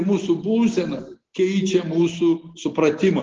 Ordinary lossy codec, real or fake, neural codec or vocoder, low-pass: Opus, 16 kbps; real; none; 7.2 kHz